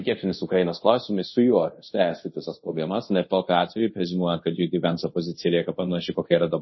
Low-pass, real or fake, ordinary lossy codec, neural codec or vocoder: 7.2 kHz; fake; MP3, 24 kbps; codec, 24 kHz, 0.5 kbps, DualCodec